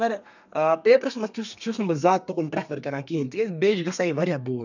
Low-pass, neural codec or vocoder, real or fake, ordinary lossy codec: 7.2 kHz; codec, 32 kHz, 1.9 kbps, SNAC; fake; none